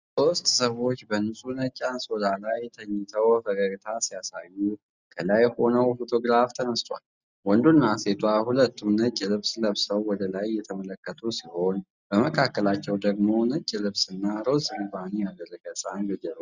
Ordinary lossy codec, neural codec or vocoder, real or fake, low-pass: Opus, 64 kbps; none; real; 7.2 kHz